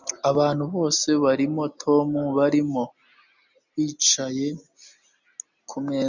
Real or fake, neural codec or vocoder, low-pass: real; none; 7.2 kHz